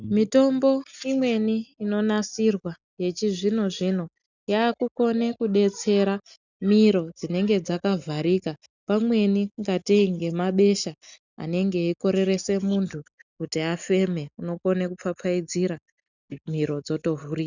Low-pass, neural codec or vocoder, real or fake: 7.2 kHz; none; real